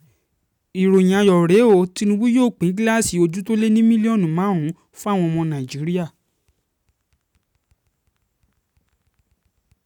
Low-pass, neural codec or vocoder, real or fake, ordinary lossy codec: 19.8 kHz; none; real; none